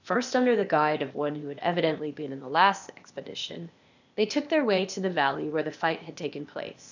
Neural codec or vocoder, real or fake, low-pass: codec, 16 kHz, 0.8 kbps, ZipCodec; fake; 7.2 kHz